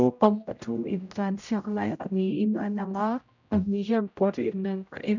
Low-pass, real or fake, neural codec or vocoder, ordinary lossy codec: 7.2 kHz; fake; codec, 16 kHz, 0.5 kbps, X-Codec, HuBERT features, trained on general audio; none